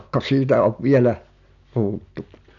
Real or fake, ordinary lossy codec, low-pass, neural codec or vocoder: real; none; 7.2 kHz; none